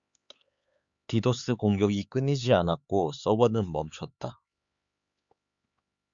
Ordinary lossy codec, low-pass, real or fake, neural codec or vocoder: Opus, 64 kbps; 7.2 kHz; fake; codec, 16 kHz, 4 kbps, X-Codec, HuBERT features, trained on LibriSpeech